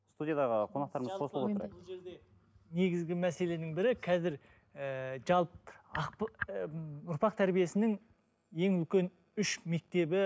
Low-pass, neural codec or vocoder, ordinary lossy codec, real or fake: none; none; none; real